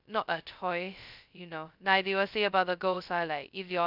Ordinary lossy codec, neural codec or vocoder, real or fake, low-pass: none; codec, 16 kHz, 0.2 kbps, FocalCodec; fake; 5.4 kHz